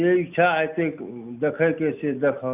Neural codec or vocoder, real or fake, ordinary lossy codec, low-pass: none; real; none; 3.6 kHz